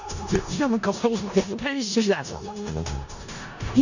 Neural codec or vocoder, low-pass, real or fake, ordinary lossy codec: codec, 16 kHz in and 24 kHz out, 0.4 kbps, LongCat-Audio-Codec, four codebook decoder; 7.2 kHz; fake; none